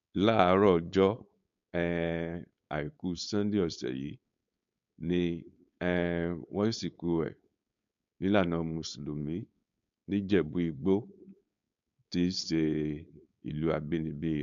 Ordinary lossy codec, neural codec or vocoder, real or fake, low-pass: MP3, 64 kbps; codec, 16 kHz, 4.8 kbps, FACodec; fake; 7.2 kHz